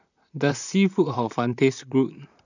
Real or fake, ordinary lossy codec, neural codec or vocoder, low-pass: fake; none; vocoder, 44.1 kHz, 128 mel bands, Pupu-Vocoder; 7.2 kHz